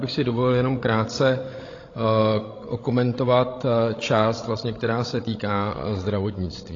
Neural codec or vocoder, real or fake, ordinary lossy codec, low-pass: codec, 16 kHz, 16 kbps, FreqCodec, larger model; fake; AAC, 32 kbps; 7.2 kHz